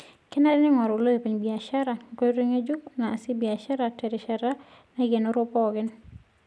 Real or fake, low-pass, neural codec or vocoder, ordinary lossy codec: real; none; none; none